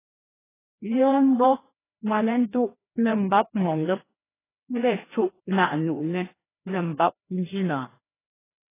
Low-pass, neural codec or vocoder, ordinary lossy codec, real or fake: 3.6 kHz; codec, 16 kHz, 1 kbps, FreqCodec, larger model; AAC, 16 kbps; fake